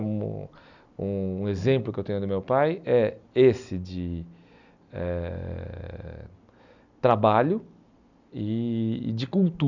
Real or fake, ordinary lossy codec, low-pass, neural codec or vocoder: real; none; 7.2 kHz; none